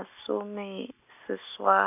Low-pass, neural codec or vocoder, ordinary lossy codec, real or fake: 3.6 kHz; none; none; real